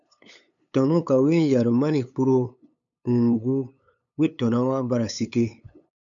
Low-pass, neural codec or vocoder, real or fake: 7.2 kHz; codec, 16 kHz, 8 kbps, FunCodec, trained on LibriTTS, 25 frames a second; fake